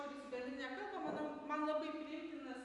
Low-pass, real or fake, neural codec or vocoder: 10.8 kHz; real; none